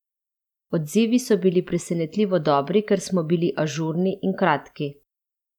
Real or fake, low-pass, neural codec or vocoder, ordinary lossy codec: real; 19.8 kHz; none; none